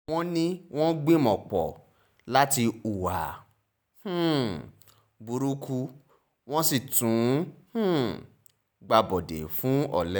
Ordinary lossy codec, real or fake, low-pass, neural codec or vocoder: none; real; none; none